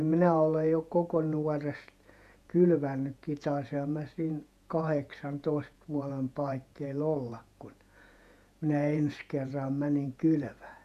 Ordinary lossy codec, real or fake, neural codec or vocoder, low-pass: none; fake; vocoder, 48 kHz, 128 mel bands, Vocos; 14.4 kHz